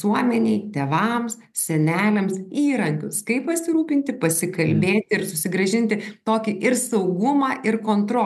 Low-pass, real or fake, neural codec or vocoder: 14.4 kHz; real; none